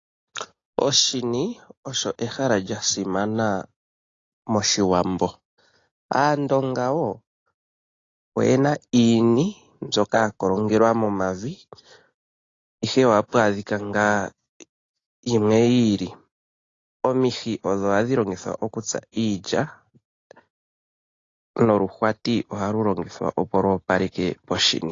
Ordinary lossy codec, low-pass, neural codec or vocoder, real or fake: AAC, 32 kbps; 7.2 kHz; none; real